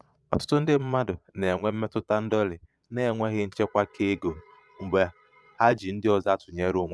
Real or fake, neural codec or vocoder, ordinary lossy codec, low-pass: fake; vocoder, 22.05 kHz, 80 mel bands, Vocos; none; none